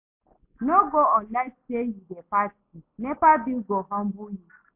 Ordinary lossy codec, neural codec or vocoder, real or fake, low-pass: MP3, 32 kbps; none; real; 3.6 kHz